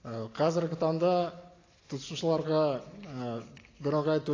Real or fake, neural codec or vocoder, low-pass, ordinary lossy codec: real; none; 7.2 kHz; AAC, 32 kbps